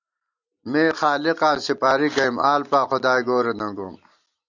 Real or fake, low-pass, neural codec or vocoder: real; 7.2 kHz; none